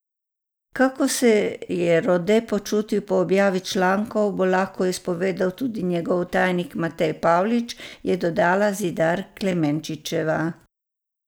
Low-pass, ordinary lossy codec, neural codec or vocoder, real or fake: none; none; none; real